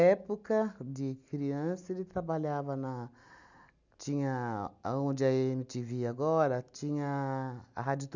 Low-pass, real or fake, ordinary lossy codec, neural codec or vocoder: 7.2 kHz; real; none; none